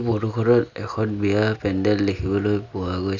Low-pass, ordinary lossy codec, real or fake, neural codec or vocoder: 7.2 kHz; none; real; none